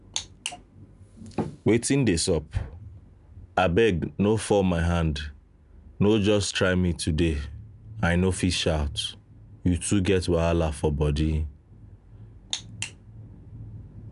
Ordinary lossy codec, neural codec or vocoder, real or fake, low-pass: none; none; real; 10.8 kHz